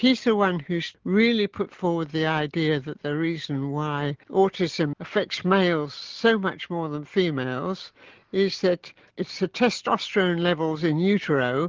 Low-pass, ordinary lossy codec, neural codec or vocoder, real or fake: 7.2 kHz; Opus, 16 kbps; none; real